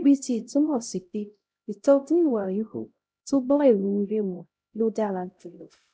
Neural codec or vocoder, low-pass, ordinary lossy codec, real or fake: codec, 16 kHz, 0.5 kbps, X-Codec, HuBERT features, trained on LibriSpeech; none; none; fake